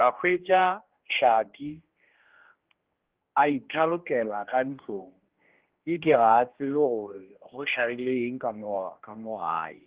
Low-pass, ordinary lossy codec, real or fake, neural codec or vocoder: 3.6 kHz; Opus, 16 kbps; fake; codec, 16 kHz, 1 kbps, X-Codec, HuBERT features, trained on general audio